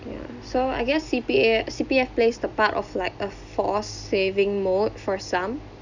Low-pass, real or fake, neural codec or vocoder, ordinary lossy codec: 7.2 kHz; real; none; none